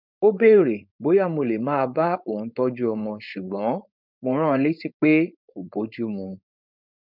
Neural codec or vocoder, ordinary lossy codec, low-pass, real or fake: codec, 16 kHz, 4.8 kbps, FACodec; none; 5.4 kHz; fake